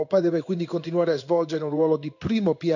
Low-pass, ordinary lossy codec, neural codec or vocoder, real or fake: 7.2 kHz; none; codec, 16 kHz in and 24 kHz out, 1 kbps, XY-Tokenizer; fake